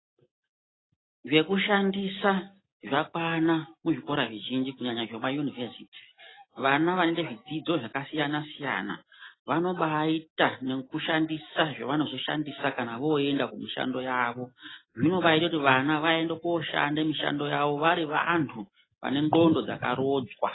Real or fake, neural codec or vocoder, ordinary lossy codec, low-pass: real; none; AAC, 16 kbps; 7.2 kHz